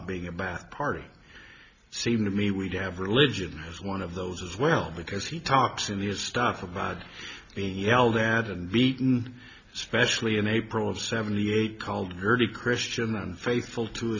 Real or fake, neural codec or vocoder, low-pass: real; none; 7.2 kHz